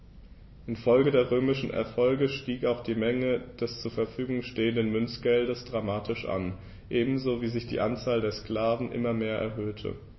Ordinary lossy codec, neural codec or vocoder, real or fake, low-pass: MP3, 24 kbps; none; real; 7.2 kHz